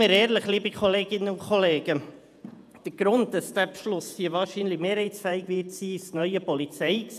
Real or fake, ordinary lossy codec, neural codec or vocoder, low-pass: fake; none; vocoder, 48 kHz, 128 mel bands, Vocos; 14.4 kHz